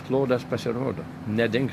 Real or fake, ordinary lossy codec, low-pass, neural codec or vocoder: real; MP3, 64 kbps; 14.4 kHz; none